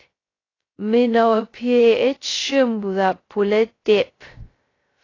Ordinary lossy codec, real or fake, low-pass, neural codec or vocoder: AAC, 32 kbps; fake; 7.2 kHz; codec, 16 kHz, 0.2 kbps, FocalCodec